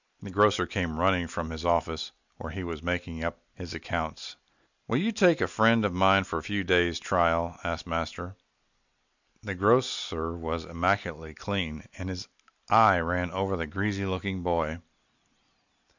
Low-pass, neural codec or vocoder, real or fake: 7.2 kHz; none; real